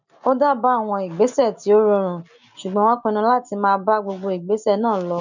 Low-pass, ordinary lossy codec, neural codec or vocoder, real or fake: 7.2 kHz; none; none; real